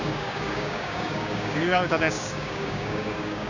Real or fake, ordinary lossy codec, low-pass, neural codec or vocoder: fake; none; 7.2 kHz; codec, 16 kHz, 6 kbps, DAC